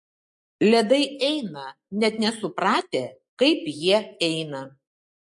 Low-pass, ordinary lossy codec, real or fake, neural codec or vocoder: 10.8 kHz; MP3, 48 kbps; real; none